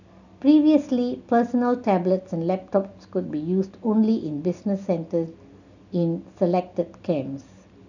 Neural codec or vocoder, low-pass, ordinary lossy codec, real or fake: none; 7.2 kHz; none; real